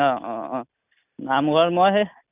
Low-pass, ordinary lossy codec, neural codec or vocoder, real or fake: 3.6 kHz; none; none; real